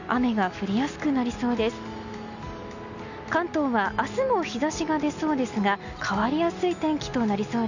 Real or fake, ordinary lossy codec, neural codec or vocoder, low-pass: real; none; none; 7.2 kHz